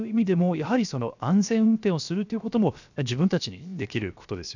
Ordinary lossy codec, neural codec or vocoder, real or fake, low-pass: none; codec, 16 kHz, 0.7 kbps, FocalCodec; fake; 7.2 kHz